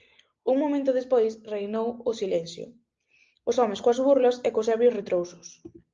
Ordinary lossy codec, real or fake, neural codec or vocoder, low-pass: Opus, 24 kbps; real; none; 7.2 kHz